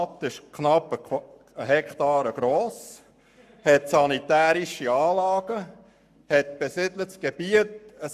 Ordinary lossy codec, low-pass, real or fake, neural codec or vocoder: none; 14.4 kHz; fake; codec, 44.1 kHz, 7.8 kbps, Pupu-Codec